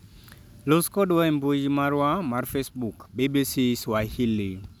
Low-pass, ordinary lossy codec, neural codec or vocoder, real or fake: none; none; none; real